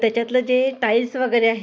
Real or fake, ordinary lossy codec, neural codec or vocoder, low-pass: real; none; none; none